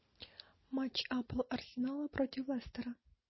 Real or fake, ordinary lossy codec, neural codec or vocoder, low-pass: real; MP3, 24 kbps; none; 7.2 kHz